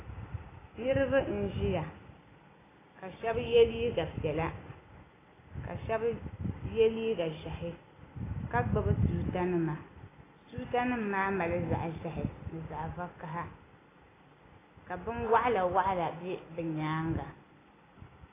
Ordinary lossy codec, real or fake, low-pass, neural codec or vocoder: AAC, 16 kbps; real; 3.6 kHz; none